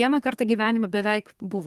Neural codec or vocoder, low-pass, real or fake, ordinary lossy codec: codec, 32 kHz, 1.9 kbps, SNAC; 14.4 kHz; fake; Opus, 24 kbps